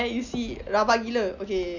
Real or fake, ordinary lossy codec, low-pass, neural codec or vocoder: real; none; 7.2 kHz; none